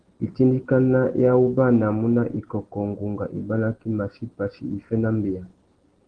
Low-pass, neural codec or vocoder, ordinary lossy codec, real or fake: 9.9 kHz; none; Opus, 16 kbps; real